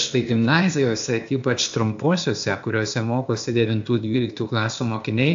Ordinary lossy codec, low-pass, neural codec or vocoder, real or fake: AAC, 64 kbps; 7.2 kHz; codec, 16 kHz, 0.8 kbps, ZipCodec; fake